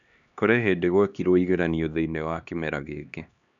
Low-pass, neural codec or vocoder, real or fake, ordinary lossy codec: 7.2 kHz; codec, 16 kHz, 2 kbps, X-Codec, HuBERT features, trained on LibriSpeech; fake; none